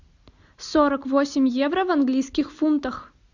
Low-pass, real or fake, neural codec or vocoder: 7.2 kHz; real; none